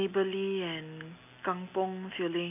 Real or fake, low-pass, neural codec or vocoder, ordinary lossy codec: real; 3.6 kHz; none; none